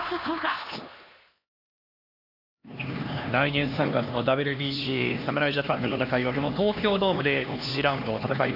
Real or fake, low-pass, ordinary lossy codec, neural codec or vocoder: fake; 5.4 kHz; none; codec, 16 kHz, 2 kbps, X-Codec, HuBERT features, trained on LibriSpeech